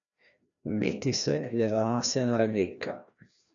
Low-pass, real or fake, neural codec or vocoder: 7.2 kHz; fake; codec, 16 kHz, 1 kbps, FreqCodec, larger model